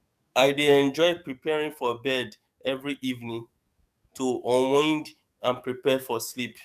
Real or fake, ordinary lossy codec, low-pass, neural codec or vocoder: fake; none; 14.4 kHz; codec, 44.1 kHz, 7.8 kbps, DAC